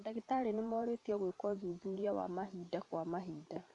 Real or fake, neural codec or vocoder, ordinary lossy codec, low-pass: fake; vocoder, 44.1 kHz, 128 mel bands every 512 samples, BigVGAN v2; none; 10.8 kHz